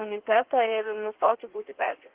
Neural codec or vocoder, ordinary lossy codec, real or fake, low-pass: codec, 16 kHz in and 24 kHz out, 1.1 kbps, FireRedTTS-2 codec; Opus, 16 kbps; fake; 3.6 kHz